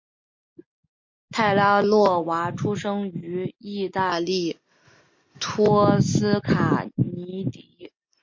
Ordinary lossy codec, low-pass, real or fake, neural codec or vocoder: MP3, 48 kbps; 7.2 kHz; real; none